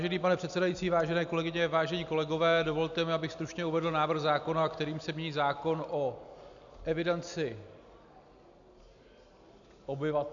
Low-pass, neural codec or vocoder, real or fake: 7.2 kHz; none; real